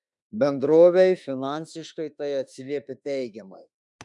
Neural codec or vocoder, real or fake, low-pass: autoencoder, 48 kHz, 32 numbers a frame, DAC-VAE, trained on Japanese speech; fake; 10.8 kHz